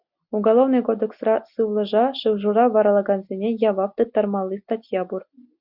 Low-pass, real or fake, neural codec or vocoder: 5.4 kHz; real; none